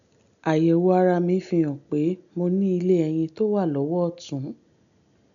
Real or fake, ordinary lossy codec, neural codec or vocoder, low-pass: real; none; none; 7.2 kHz